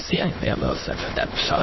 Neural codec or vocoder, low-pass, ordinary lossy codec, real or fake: autoencoder, 22.05 kHz, a latent of 192 numbers a frame, VITS, trained on many speakers; 7.2 kHz; MP3, 24 kbps; fake